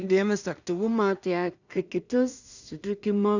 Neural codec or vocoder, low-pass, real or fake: codec, 16 kHz in and 24 kHz out, 0.4 kbps, LongCat-Audio-Codec, two codebook decoder; 7.2 kHz; fake